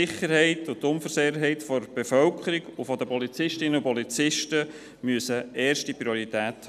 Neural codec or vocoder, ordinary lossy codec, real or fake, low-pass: none; none; real; 14.4 kHz